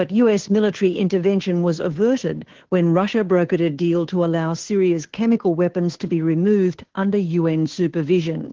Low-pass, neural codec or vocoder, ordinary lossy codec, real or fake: 7.2 kHz; codec, 16 kHz, 2 kbps, FunCodec, trained on Chinese and English, 25 frames a second; Opus, 16 kbps; fake